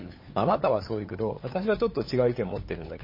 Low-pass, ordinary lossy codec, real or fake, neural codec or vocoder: 5.4 kHz; MP3, 24 kbps; fake; codec, 16 kHz, 8 kbps, FunCodec, trained on LibriTTS, 25 frames a second